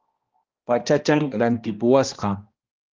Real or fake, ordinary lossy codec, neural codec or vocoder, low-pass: fake; Opus, 32 kbps; codec, 16 kHz, 1 kbps, X-Codec, HuBERT features, trained on balanced general audio; 7.2 kHz